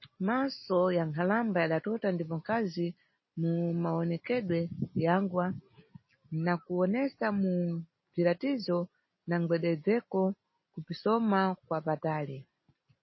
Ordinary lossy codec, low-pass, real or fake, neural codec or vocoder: MP3, 24 kbps; 7.2 kHz; real; none